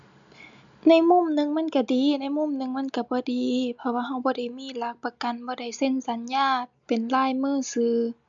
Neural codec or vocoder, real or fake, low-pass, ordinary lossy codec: none; real; 7.2 kHz; MP3, 64 kbps